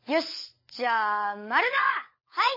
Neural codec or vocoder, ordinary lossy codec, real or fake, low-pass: codec, 16 kHz, 16 kbps, FunCodec, trained on LibriTTS, 50 frames a second; MP3, 24 kbps; fake; 5.4 kHz